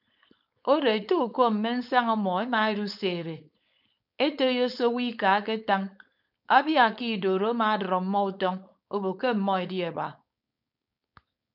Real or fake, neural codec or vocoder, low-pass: fake; codec, 16 kHz, 4.8 kbps, FACodec; 5.4 kHz